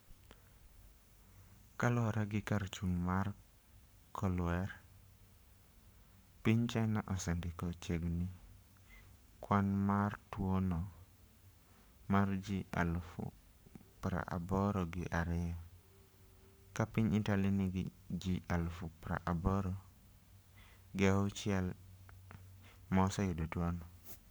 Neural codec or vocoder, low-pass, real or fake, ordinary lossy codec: codec, 44.1 kHz, 7.8 kbps, Pupu-Codec; none; fake; none